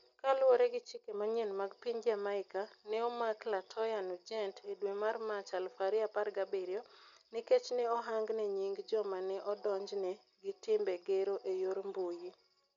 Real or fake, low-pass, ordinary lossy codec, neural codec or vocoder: real; 7.2 kHz; none; none